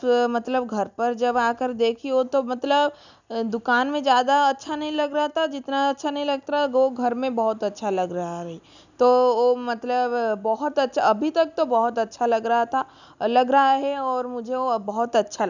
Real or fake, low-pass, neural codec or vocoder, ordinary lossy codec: real; 7.2 kHz; none; none